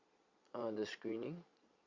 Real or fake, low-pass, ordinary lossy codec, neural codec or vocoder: fake; 7.2 kHz; Opus, 64 kbps; vocoder, 22.05 kHz, 80 mel bands, Vocos